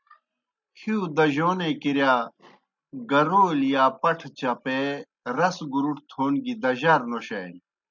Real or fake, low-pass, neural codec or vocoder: real; 7.2 kHz; none